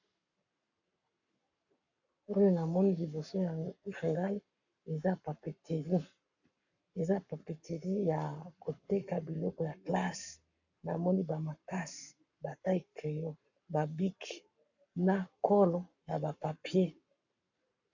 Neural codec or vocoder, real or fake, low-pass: codec, 44.1 kHz, 7.8 kbps, Pupu-Codec; fake; 7.2 kHz